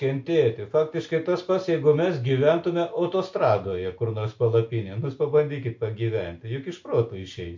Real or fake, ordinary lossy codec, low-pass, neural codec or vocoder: real; MP3, 48 kbps; 7.2 kHz; none